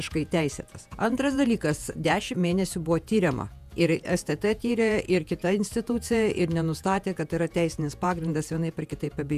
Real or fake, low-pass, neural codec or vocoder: fake; 14.4 kHz; vocoder, 48 kHz, 128 mel bands, Vocos